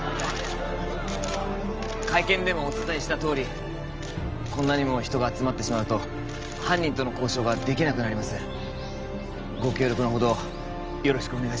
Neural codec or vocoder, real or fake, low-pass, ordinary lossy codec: none; real; 7.2 kHz; Opus, 24 kbps